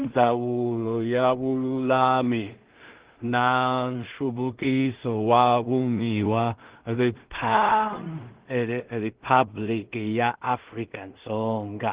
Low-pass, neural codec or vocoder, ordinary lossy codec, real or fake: 3.6 kHz; codec, 16 kHz in and 24 kHz out, 0.4 kbps, LongCat-Audio-Codec, two codebook decoder; Opus, 16 kbps; fake